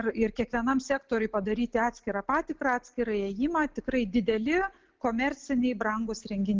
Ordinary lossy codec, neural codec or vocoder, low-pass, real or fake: Opus, 16 kbps; none; 7.2 kHz; real